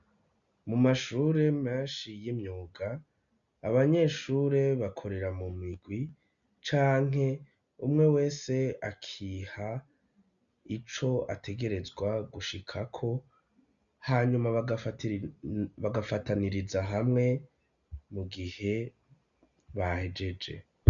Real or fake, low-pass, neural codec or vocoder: real; 7.2 kHz; none